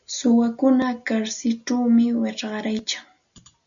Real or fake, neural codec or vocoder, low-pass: real; none; 7.2 kHz